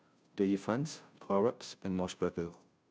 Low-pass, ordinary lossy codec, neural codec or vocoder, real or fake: none; none; codec, 16 kHz, 0.5 kbps, FunCodec, trained on Chinese and English, 25 frames a second; fake